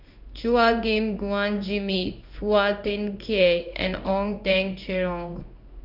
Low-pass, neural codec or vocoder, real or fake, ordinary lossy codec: 5.4 kHz; codec, 16 kHz in and 24 kHz out, 1 kbps, XY-Tokenizer; fake; none